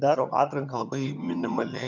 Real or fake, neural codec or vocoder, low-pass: fake; vocoder, 22.05 kHz, 80 mel bands, HiFi-GAN; 7.2 kHz